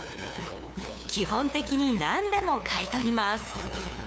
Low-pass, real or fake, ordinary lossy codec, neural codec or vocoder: none; fake; none; codec, 16 kHz, 2 kbps, FunCodec, trained on LibriTTS, 25 frames a second